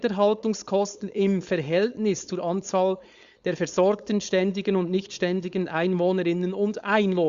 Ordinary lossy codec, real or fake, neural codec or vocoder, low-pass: Opus, 64 kbps; fake; codec, 16 kHz, 4.8 kbps, FACodec; 7.2 kHz